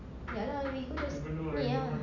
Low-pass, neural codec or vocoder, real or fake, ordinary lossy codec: 7.2 kHz; none; real; none